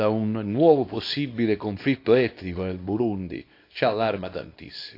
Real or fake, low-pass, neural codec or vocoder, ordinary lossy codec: fake; 5.4 kHz; codec, 24 kHz, 0.9 kbps, WavTokenizer, small release; AAC, 32 kbps